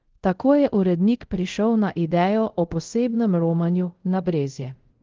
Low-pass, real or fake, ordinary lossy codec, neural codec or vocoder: 7.2 kHz; fake; Opus, 16 kbps; codec, 24 kHz, 0.5 kbps, DualCodec